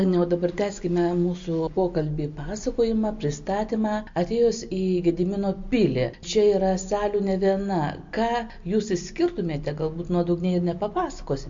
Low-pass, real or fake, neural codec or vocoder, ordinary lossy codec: 7.2 kHz; real; none; MP3, 48 kbps